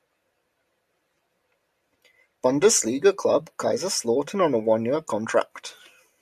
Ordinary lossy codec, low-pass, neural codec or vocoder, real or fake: AAC, 96 kbps; 14.4 kHz; none; real